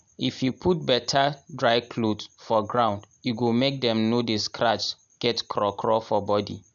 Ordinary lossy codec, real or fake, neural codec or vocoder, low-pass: none; real; none; 7.2 kHz